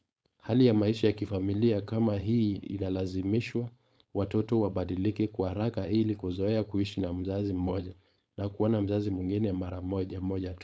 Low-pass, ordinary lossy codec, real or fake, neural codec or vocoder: none; none; fake; codec, 16 kHz, 4.8 kbps, FACodec